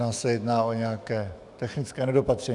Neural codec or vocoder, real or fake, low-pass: autoencoder, 48 kHz, 128 numbers a frame, DAC-VAE, trained on Japanese speech; fake; 10.8 kHz